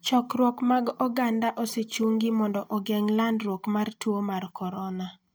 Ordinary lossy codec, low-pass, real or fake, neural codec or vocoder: none; none; real; none